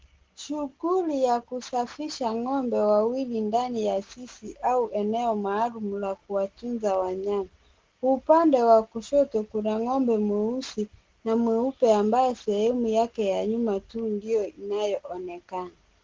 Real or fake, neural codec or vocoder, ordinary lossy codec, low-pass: real; none; Opus, 16 kbps; 7.2 kHz